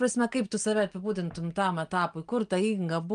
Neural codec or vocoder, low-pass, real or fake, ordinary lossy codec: none; 9.9 kHz; real; Opus, 32 kbps